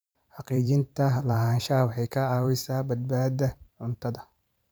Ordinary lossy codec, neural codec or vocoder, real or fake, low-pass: none; vocoder, 44.1 kHz, 128 mel bands every 512 samples, BigVGAN v2; fake; none